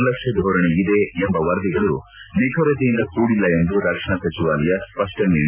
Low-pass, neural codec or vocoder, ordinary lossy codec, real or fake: 3.6 kHz; none; none; real